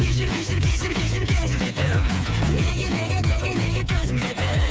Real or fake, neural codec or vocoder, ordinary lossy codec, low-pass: fake; codec, 16 kHz, 4 kbps, FreqCodec, smaller model; none; none